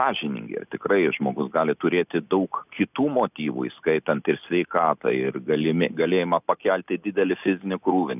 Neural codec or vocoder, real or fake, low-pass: none; real; 3.6 kHz